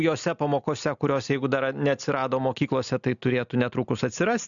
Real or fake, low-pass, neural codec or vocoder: real; 7.2 kHz; none